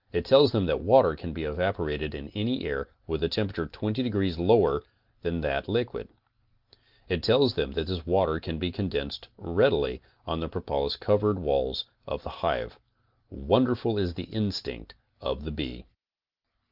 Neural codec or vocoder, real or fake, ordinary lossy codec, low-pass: none; real; Opus, 24 kbps; 5.4 kHz